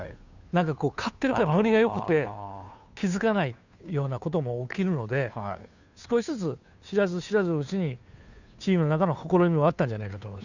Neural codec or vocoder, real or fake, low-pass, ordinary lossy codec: codec, 16 kHz, 2 kbps, FunCodec, trained on Chinese and English, 25 frames a second; fake; 7.2 kHz; none